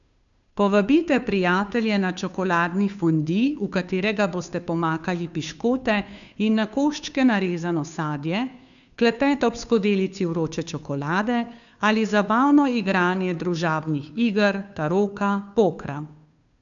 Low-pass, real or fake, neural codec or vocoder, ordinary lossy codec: 7.2 kHz; fake; codec, 16 kHz, 2 kbps, FunCodec, trained on Chinese and English, 25 frames a second; none